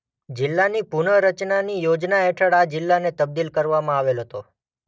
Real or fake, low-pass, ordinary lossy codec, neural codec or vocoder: real; none; none; none